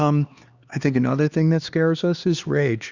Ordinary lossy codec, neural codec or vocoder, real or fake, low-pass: Opus, 64 kbps; codec, 16 kHz, 2 kbps, X-Codec, HuBERT features, trained on LibriSpeech; fake; 7.2 kHz